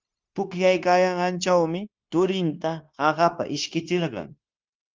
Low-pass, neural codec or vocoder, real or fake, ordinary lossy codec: 7.2 kHz; codec, 16 kHz, 0.9 kbps, LongCat-Audio-Codec; fake; Opus, 32 kbps